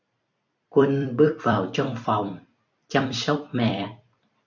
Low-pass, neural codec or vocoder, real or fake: 7.2 kHz; vocoder, 44.1 kHz, 128 mel bands every 256 samples, BigVGAN v2; fake